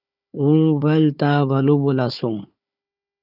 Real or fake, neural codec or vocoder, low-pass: fake; codec, 16 kHz, 4 kbps, FunCodec, trained on Chinese and English, 50 frames a second; 5.4 kHz